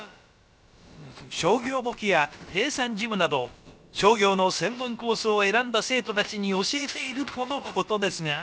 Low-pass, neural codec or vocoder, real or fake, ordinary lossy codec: none; codec, 16 kHz, about 1 kbps, DyCAST, with the encoder's durations; fake; none